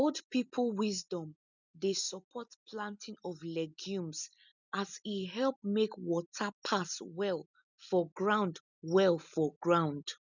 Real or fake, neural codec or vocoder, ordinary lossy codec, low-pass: real; none; none; 7.2 kHz